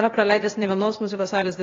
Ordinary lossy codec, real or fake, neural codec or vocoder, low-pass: AAC, 32 kbps; fake; codec, 16 kHz, 0.8 kbps, ZipCodec; 7.2 kHz